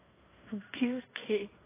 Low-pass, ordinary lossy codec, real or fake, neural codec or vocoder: 3.6 kHz; AAC, 16 kbps; fake; codec, 16 kHz in and 24 kHz out, 0.9 kbps, LongCat-Audio-Codec, fine tuned four codebook decoder